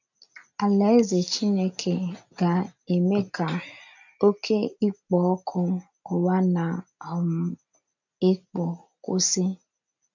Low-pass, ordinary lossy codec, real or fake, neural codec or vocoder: 7.2 kHz; none; real; none